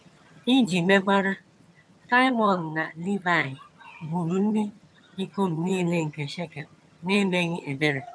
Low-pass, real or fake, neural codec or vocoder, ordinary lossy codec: none; fake; vocoder, 22.05 kHz, 80 mel bands, HiFi-GAN; none